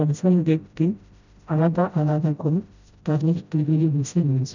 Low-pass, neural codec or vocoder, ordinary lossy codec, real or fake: 7.2 kHz; codec, 16 kHz, 0.5 kbps, FreqCodec, smaller model; none; fake